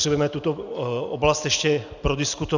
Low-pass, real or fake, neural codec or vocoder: 7.2 kHz; real; none